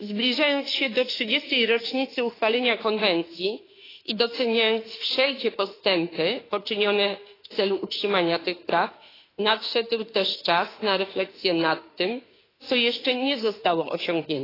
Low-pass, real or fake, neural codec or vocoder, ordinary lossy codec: 5.4 kHz; fake; autoencoder, 48 kHz, 32 numbers a frame, DAC-VAE, trained on Japanese speech; AAC, 24 kbps